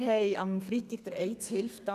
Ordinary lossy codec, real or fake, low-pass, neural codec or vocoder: none; fake; 14.4 kHz; codec, 32 kHz, 1.9 kbps, SNAC